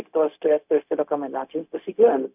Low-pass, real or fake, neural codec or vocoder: 3.6 kHz; fake; codec, 16 kHz, 0.4 kbps, LongCat-Audio-Codec